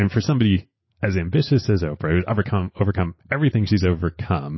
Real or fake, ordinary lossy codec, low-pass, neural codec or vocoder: real; MP3, 24 kbps; 7.2 kHz; none